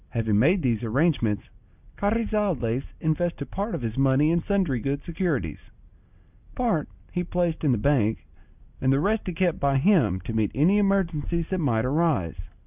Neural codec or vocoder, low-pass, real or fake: none; 3.6 kHz; real